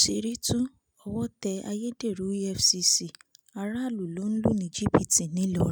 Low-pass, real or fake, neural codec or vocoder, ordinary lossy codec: none; real; none; none